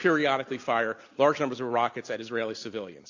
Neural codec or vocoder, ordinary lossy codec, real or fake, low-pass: none; AAC, 48 kbps; real; 7.2 kHz